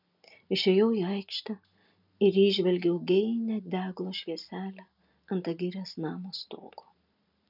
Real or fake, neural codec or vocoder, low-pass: real; none; 5.4 kHz